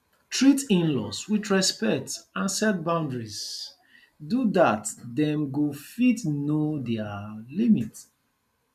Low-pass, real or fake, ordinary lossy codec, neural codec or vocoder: 14.4 kHz; real; none; none